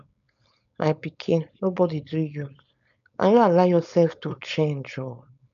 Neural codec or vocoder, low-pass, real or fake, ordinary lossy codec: codec, 16 kHz, 4.8 kbps, FACodec; 7.2 kHz; fake; none